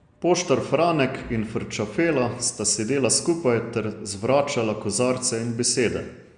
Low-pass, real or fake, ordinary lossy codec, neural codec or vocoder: 9.9 kHz; real; Opus, 64 kbps; none